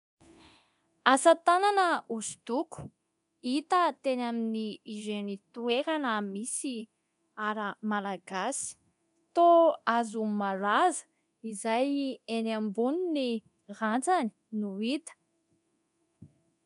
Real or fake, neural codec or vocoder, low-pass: fake; codec, 24 kHz, 0.9 kbps, DualCodec; 10.8 kHz